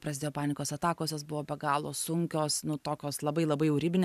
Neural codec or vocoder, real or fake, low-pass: vocoder, 44.1 kHz, 128 mel bands every 512 samples, BigVGAN v2; fake; 14.4 kHz